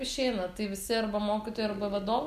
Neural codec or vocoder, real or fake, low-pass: none; real; 14.4 kHz